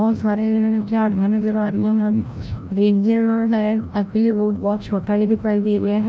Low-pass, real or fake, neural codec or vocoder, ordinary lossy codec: none; fake; codec, 16 kHz, 0.5 kbps, FreqCodec, larger model; none